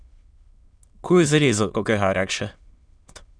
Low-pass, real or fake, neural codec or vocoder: 9.9 kHz; fake; autoencoder, 22.05 kHz, a latent of 192 numbers a frame, VITS, trained on many speakers